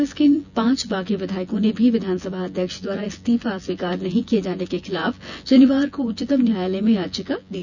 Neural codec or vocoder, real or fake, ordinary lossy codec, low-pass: vocoder, 24 kHz, 100 mel bands, Vocos; fake; none; 7.2 kHz